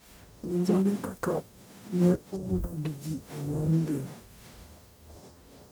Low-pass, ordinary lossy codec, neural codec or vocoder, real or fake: none; none; codec, 44.1 kHz, 0.9 kbps, DAC; fake